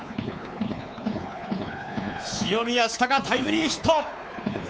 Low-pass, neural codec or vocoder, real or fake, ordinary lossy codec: none; codec, 16 kHz, 4 kbps, X-Codec, WavLM features, trained on Multilingual LibriSpeech; fake; none